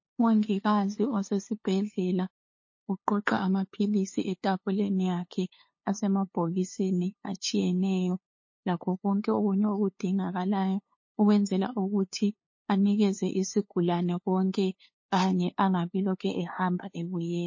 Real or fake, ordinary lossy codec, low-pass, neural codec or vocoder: fake; MP3, 32 kbps; 7.2 kHz; codec, 16 kHz, 2 kbps, FunCodec, trained on LibriTTS, 25 frames a second